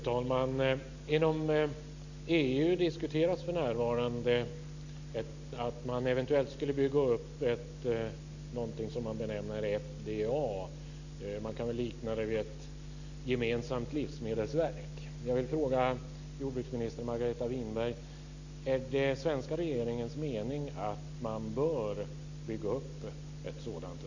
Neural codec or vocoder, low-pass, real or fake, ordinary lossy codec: none; 7.2 kHz; real; none